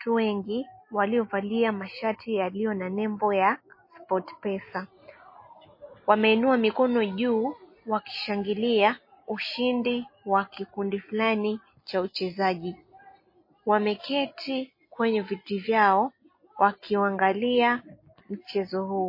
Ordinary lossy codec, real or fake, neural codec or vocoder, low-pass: MP3, 24 kbps; real; none; 5.4 kHz